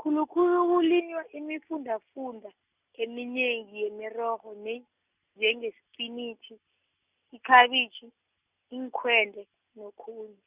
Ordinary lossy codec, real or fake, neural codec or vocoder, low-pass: Opus, 64 kbps; real; none; 3.6 kHz